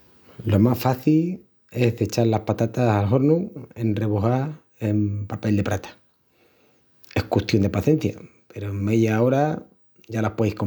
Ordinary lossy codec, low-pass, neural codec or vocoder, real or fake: none; none; none; real